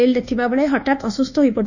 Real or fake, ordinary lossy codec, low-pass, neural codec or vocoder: fake; none; 7.2 kHz; codec, 24 kHz, 1.2 kbps, DualCodec